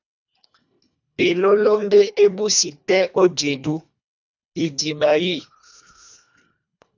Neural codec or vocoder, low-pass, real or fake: codec, 24 kHz, 1.5 kbps, HILCodec; 7.2 kHz; fake